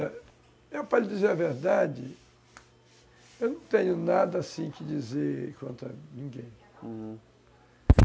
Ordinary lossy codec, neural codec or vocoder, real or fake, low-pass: none; none; real; none